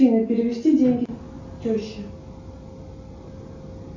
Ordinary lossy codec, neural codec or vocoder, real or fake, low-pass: AAC, 48 kbps; none; real; 7.2 kHz